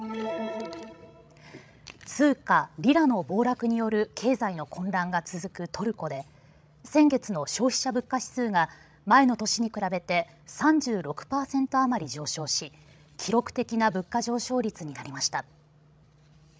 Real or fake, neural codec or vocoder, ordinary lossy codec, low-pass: fake; codec, 16 kHz, 16 kbps, FreqCodec, larger model; none; none